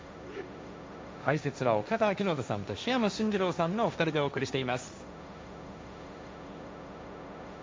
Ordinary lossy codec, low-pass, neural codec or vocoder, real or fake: none; none; codec, 16 kHz, 1.1 kbps, Voila-Tokenizer; fake